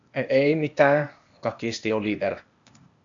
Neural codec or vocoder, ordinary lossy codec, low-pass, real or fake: codec, 16 kHz, 0.8 kbps, ZipCodec; AAC, 64 kbps; 7.2 kHz; fake